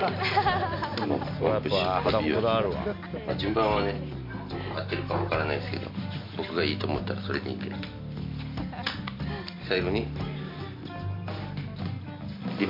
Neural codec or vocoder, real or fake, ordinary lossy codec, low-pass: none; real; MP3, 32 kbps; 5.4 kHz